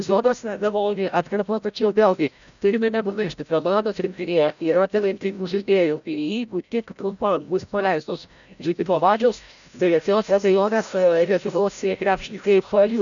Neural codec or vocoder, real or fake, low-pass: codec, 16 kHz, 0.5 kbps, FreqCodec, larger model; fake; 7.2 kHz